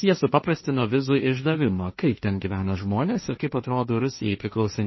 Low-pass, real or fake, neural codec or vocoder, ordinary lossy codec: 7.2 kHz; fake; codec, 16 kHz, 1.1 kbps, Voila-Tokenizer; MP3, 24 kbps